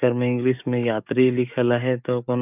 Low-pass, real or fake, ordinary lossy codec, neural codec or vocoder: 3.6 kHz; fake; none; codec, 44.1 kHz, 7.8 kbps, DAC